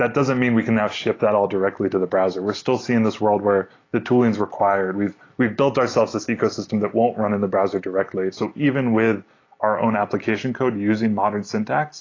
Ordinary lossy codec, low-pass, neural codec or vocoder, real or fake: AAC, 32 kbps; 7.2 kHz; none; real